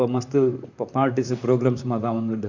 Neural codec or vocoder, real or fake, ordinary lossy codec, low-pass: vocoder, 44.1 kHz, 128 mel bands, Pupu-Vocoder; fake; none; 7.2 kHz